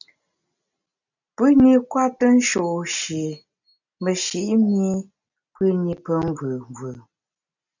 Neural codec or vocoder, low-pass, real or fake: none; 7.2 kHz; real